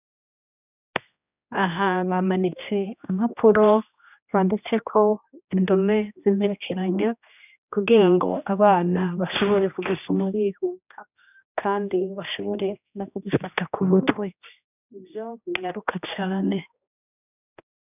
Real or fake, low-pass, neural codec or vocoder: fake; 3.6 kHz; codec, 16 kHz, 1 kbps, X-Codec, HuBERT features, trained on general audio